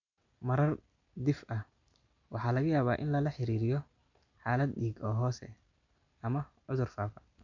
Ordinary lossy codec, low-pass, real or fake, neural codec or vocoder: none; 7.2 kHz; real; none